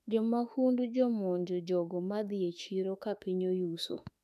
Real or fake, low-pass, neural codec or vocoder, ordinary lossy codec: fake; 14.4 kHz; autoencoder, 48 kHz, 32 numbers a frame, DAC-VAE, trained on Japanese speech; none